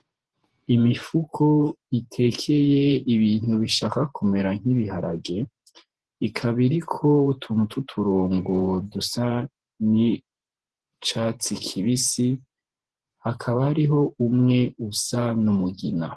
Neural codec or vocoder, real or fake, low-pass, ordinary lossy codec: vocoder, 48 kHz, 128 mel bands, Vocos; fake; 10.8 kHz; Opus, 16 kbps